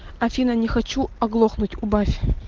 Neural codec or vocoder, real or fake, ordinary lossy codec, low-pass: none; real; Opus, 16 kbps; 7.2 kHz